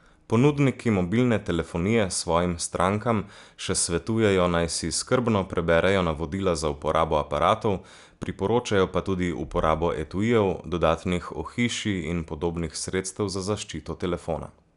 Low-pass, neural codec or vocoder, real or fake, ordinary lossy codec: 10.8 kHz; none; real; none